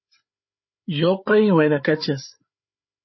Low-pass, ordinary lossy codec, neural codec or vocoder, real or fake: 7.2 kHz; MP3, 24 kbps; codec, 16 kHz, 8 kbps, FreqCodec, larger model; fake